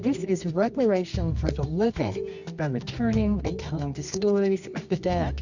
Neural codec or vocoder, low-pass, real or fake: codec, 24 kHz, 0.9 kbps, WavTokenizer, medium music audio release; 7.2 kHz; fake